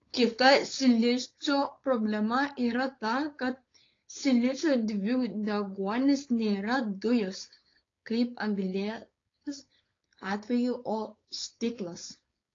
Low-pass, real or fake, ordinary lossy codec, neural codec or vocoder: 7.2 kHz; fake; AAC, 32 kbps; codec, 16 kHz, 4.8 kbps, FACodec